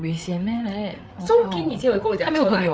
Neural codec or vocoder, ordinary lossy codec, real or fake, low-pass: codec, 16 kHz, 8 kbps, FreqCodec, larger model; none; fake; none